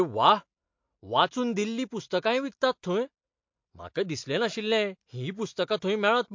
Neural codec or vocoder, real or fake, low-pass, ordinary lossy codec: none; real; 7.2 kHz; MP3, 48 kbps